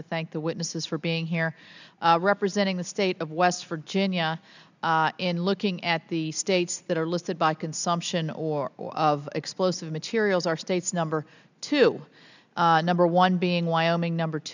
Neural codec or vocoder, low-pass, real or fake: none; 7.2 kHz; real